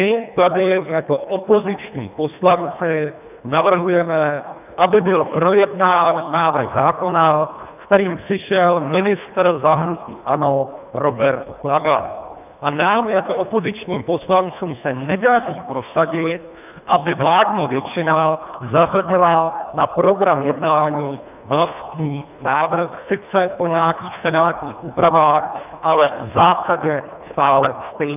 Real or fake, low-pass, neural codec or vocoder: fake; 3.6 kHz; codec, 24 kHz, 1.5 kbps, HILCodec